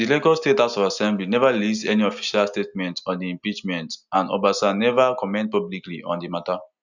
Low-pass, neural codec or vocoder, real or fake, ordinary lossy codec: 7.2 kHz; none; real; none